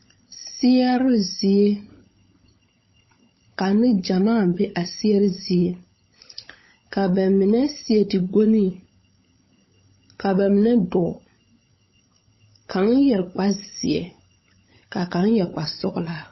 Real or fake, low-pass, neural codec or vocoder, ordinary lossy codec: fake; 7.2 kHz; codec, 16 kHz, 16 kbps, FunCodec, trained on LibriTTS, 50 frames a second; MP3, 24 kbps